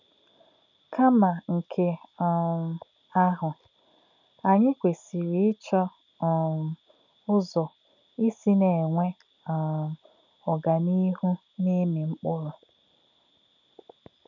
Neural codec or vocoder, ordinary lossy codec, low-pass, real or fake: none; none; 7.2 kHz; real